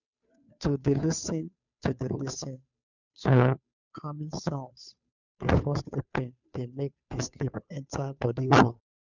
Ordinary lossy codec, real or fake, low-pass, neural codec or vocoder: none; fake; 7.2 kHz; codec, 16 kHz, 2 kbps, FunCodec, trained on Chinese and English, 25 frames a second